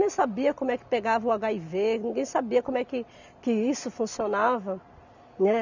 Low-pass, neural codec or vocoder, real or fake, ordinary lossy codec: 7.2 kHz; none; real; none